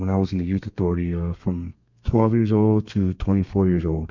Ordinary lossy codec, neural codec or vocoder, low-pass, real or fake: MP3, 64 kbps; codec, 32 kHz, 1.9 kbps, SNAC; 7.2 kHz; fake